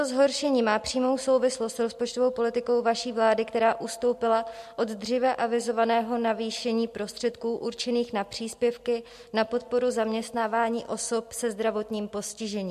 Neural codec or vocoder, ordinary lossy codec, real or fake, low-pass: none; MP3, 64 kbps; real; 14.4 kHz